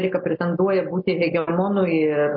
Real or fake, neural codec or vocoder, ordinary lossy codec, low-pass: real; none; MP3, 32 kbps; 5.4 kHz